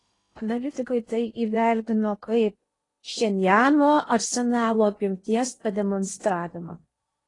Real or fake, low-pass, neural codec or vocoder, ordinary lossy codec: fake; 10.8 kHz; codec, 16 kHz in and 24 kHz out, 0.8 kbps, FocalCodec, streaming, 65536 codes; AAC, 32 kbps